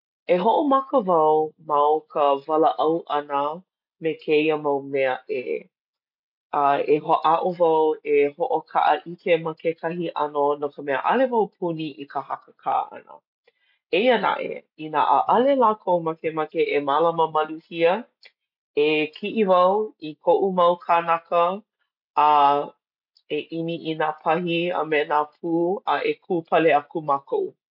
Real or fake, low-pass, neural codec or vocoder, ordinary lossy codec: real; 5.4 kHz; none; MP3, 48 kbps